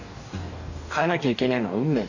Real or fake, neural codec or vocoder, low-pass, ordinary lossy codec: fake; codec, 44.1 kHz, 2.6 kbps, DAC; 7.2 kHz; none